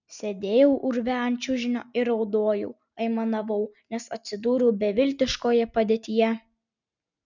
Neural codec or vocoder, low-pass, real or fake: none; 7.2 kHz; real